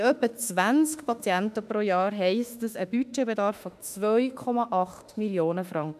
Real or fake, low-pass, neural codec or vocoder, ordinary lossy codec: fake; 14.4 kHz; autoencoder, 48 kHz, 32 numbers a frame, DAC-VAE, trained on Japanese speech; none